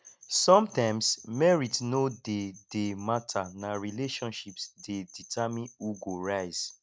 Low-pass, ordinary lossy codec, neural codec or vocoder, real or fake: none; none; none; real